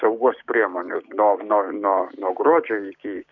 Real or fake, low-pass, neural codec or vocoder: fake; 7.2 kHz; codec, 16 kHz, 6 kbps, DAC